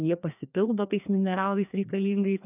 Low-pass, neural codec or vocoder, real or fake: 3.6 kHz; codec, 16 kHz, 2 kbps, FreqCodec, larger model; fake